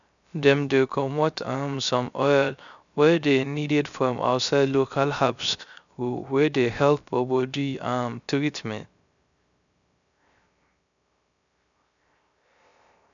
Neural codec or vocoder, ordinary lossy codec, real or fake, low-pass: codec, 16 kHz, 0.3 kbps, FocalCodec; none; fake; 7.2 kHz